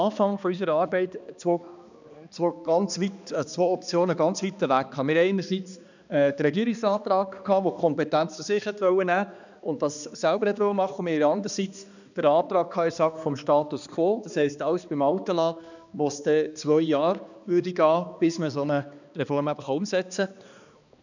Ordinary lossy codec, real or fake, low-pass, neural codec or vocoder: none; fake; 7.2 kHz; codec, 16 kHz, 2 kbps, X-Codec, HuBERT features, trained on balanced general audio